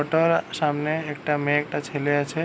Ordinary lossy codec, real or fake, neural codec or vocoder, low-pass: none; real; none; none